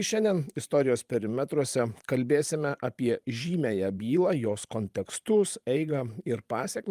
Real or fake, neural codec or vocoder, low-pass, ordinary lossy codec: fake; vocoder, 44.1 kHz, 128 mel bands every 512 samples, BigVGAN v2; 14.4 kHz; Opus, 32 kbps